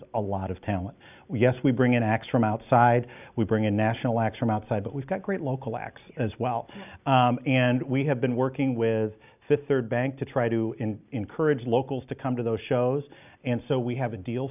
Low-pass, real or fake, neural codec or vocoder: 3.6 kHz; real; none